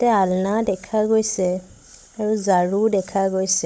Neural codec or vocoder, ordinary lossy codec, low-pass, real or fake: codec, 16 kHz, 16 kbps, FunCodec, trained on Chinese and English, 50 frames a second; none; none; fake